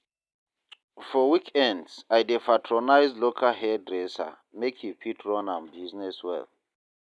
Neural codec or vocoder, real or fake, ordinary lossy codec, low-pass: none; real; none; none